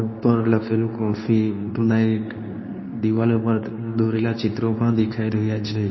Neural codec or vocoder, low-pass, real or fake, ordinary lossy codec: codec, 24 kHz, 0.9 kbps, WavTokenizer, medium speech release version 2; 7.2 kHz; fake; MP3, 24 kbps